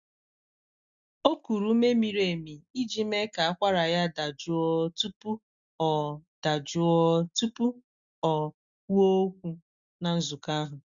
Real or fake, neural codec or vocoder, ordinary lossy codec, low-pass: real; none; Opus, 64 kbps; 7.2 kHz